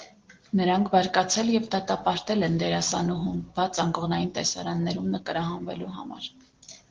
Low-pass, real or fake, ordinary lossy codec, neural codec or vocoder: 7.2 kHz; real; Opus, 16 kbps; none